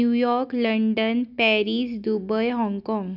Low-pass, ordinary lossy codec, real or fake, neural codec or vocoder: 5.4 kHz; none; real; none